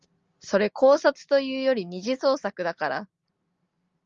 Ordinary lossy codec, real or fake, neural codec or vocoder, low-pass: Opus, 32 kbps; real; none; 7.2 kHz